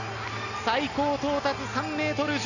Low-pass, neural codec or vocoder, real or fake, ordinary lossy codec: 7.2 kHz; none; real; none